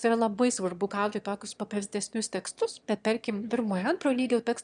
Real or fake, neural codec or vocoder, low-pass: fake; autoencoder, 22.05 kHz, a latent of 192 numbers a frame, VITS, trained on one speaker; 9.9 kHz